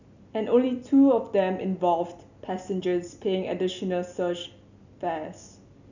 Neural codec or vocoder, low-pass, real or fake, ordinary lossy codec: none; 7.2 kHz; real; none